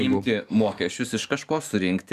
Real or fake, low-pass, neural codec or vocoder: fake; 14.4 kHz; codec, 44.1 kHz, 7.8 kbps, DAC